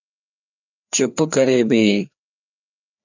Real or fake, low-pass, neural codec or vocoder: fake; 7.2 kHz; codec, 16 kHz, 2 kbps, FreqCodec, larger model